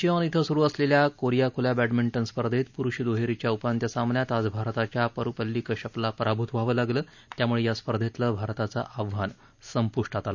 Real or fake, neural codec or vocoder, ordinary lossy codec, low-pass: real; none; none; 7.2 kHz